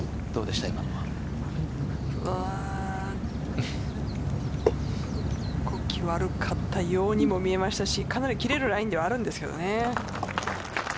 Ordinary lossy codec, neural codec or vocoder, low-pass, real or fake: none; none; none; real